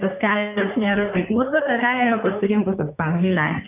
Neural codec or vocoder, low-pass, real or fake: codec, 24 kHz, 1 kbps, SNAC; 3.6 kHz; fake